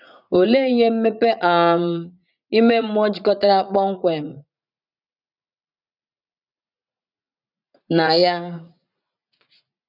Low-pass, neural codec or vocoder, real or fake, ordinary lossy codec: 5.4 kHz; vocoder, 44.1 kHz, 80 mel bands, Vocos; fake; none